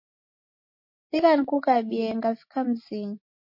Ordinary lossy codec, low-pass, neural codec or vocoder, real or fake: MP3, 32 kbps; 5.4 kHz; none; real